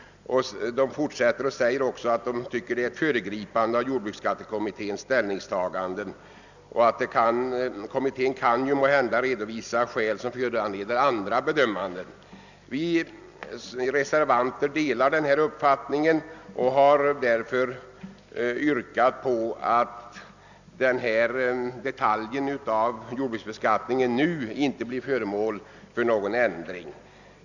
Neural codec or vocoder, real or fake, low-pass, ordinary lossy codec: none; real; 7.2 kHz; none